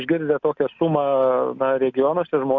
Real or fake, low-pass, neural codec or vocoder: real; 7.2 kHz; none